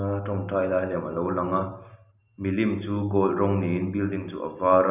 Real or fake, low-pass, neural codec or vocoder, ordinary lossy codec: real; 3.6 kHz; none; none